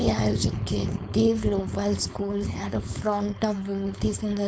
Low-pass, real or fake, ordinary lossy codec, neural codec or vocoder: none; fake; none; codec, 16 kHz, 4.8 kbps, FACodec